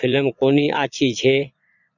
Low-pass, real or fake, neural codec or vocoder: 7.2 kHz; fake; vocoder, 22.05 kHz, 80 mel bands, Vocos